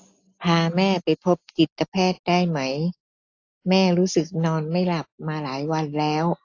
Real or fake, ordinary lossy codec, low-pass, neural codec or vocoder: real; AAC, 48 kbps; 7.2 kHz; none